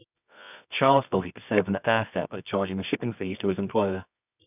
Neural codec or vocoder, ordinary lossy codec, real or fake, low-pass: codec, 24 kHz, 0.9 kbps, WavTokenizer, medium music audio release; none; fake; 3.6 kHz